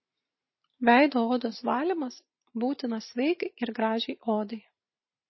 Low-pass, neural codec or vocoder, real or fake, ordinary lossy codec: 7.2 kHz; vocoder, 44.1 kHz, 128 mel bands every 512 samples, BigVGAN v2; fake; MP3, 24 kbps